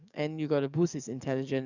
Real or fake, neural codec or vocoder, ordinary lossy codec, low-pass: fake; codec, 44.1 kHz, 7.8 kbps, DAC; none; 7.2 kHz